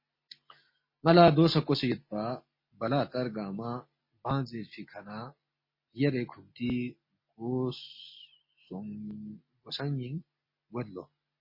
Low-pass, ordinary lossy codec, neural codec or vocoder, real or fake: 5.4 kHz; MP3, 24 kbps; none; real